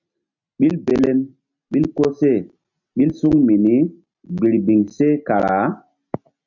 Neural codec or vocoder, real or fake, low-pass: none; real; 7.2 kHz